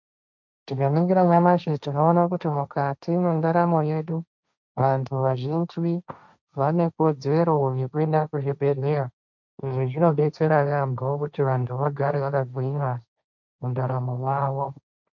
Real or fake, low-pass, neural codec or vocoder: fake; 7.2 kHz; codec, 16 kHz, 1.1 kbps, Voila-Tokenizer